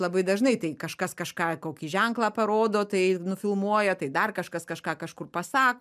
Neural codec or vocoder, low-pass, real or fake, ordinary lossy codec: none; 14.4 kHz; real; MP3, 96 kbps